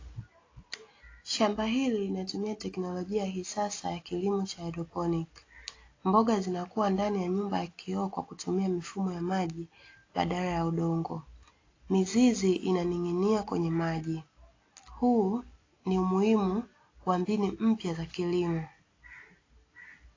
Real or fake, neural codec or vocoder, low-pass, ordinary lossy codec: real; none; 7.2 kHz; AAC, 32 kbps